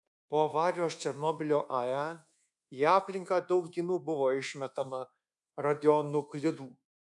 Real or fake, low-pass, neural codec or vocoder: fake; 10.8 kHz; codec, 24 kHz, 1.2 kbps, DualCodec